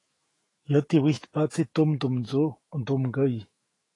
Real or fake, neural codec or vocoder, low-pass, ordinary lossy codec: fake; autoencoder, 48 kHz, 128 numbers a frame, DAC-VAE, trained on Japanese speech; 10.8 kHz; AAC, 32 kbps